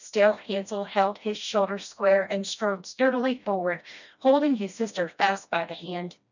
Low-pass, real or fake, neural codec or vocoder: 7.2 kHz; fake; codec, 16 kHz, 1 kbps, FreqCodec, smaller model